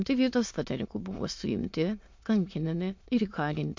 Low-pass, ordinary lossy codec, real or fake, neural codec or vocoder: 7.2 kHz; MP3, 48 kbps; fake; autoencoder, 22.05 kHz, a latent of 192 numbers a frame, VITS, trained on many speakers